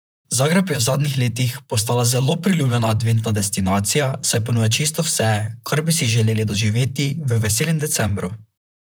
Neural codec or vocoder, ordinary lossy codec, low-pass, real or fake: vocoder, 44.1 kHz, 128 mel bands, Pupu-Vocoder; none; none; fake